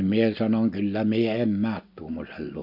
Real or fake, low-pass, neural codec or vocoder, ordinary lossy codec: real; 5.4 kHz; none; none